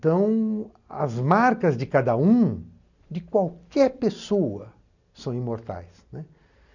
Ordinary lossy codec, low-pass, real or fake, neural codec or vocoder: none; 7.2 kHz; real; none